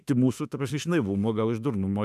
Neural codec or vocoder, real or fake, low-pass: autoencoder, 48 kHz, 32 numbers a frame, DAC-VAE, trained on Japanese speech; fake; 14.4 kHz